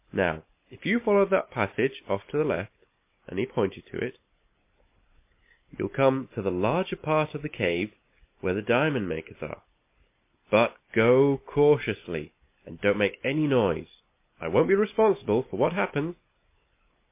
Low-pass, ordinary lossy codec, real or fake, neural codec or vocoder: 3.6 kHz; MP3, 24 kbps; real; none